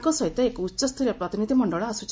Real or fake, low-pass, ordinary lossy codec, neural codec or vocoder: real; none; none; none